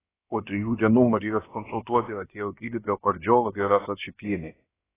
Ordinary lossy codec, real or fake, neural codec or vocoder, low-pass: AAC, 16 kbps; fake; codec, 16 kHz, about 1 kbps, DyCAST, with the encoder's durations; 3.6 kHz